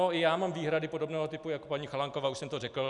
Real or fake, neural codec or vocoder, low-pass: real; none; 10.8 kHz